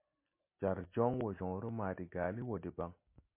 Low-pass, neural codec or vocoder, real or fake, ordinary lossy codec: 3.6 kHz; none; real; MP3, 24 kbps